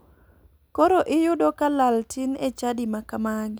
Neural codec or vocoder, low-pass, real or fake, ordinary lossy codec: none; none; real; none